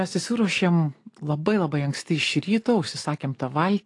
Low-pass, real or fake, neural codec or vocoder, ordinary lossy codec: 10.8 kHz; real; none; AAC, 48 kbps